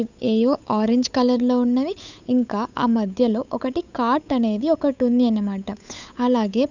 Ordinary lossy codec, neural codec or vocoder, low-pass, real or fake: none; codec, 16 kHz, 8 kbps, FunCodec, trained on Chinese and English, 25 frames a second; 7.2 kHz; fake